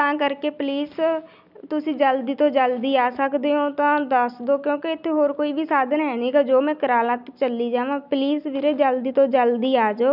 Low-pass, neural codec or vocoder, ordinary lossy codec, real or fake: 5.4 kHz; none; none; real